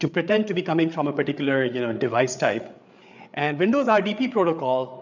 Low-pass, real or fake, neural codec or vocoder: 7.2 kHz; fake; codec, 16 kHz, 8 kbps, FreqCodec, larger model